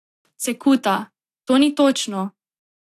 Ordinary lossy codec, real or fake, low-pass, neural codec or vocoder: AAC, 64 kbps; real; 14.4 kHz; none